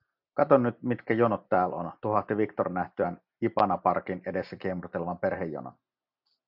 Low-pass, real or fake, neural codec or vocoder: 5.4 kHz; real; none